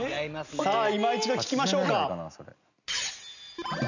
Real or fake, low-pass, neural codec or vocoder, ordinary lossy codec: real; 7.2 kHz; none; none